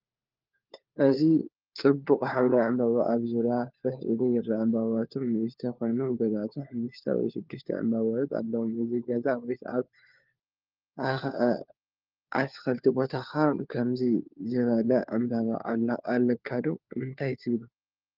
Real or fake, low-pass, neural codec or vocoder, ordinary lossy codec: fake; 5.4 kHz; codec, 16 kHz, 4 kbps, FunCodec, trained on LibriTTS, 50 frames a second; Opus, 32 kbps